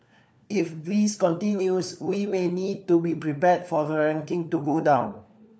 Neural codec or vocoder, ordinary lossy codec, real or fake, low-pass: codec, 16 kHz, 4 kbps, FunCodec, trained on LibriTTS, 50 frames a second; none; fake; none